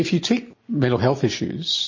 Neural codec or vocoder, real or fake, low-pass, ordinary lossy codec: none; real; 7.2 kHz; MP3, 32 kbps